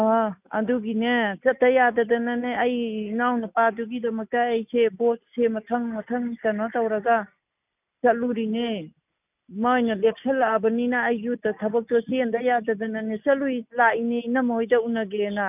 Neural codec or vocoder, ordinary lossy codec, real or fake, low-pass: none; none; real; 3.6 kHz